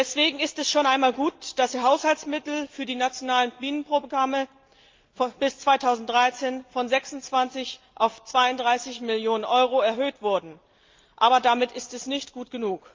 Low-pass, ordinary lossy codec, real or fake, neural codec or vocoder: 7.2 kHz; Opus, 24 kbps; real; none